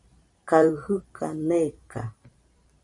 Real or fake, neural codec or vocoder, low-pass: fake; vocoder, 44.1 kHz, 128 mel bands every 512 samples, BigVGAN v2; 10.8 kHz